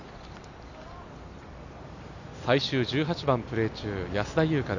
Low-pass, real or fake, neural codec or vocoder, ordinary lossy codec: 7.2 kHz; real; none; none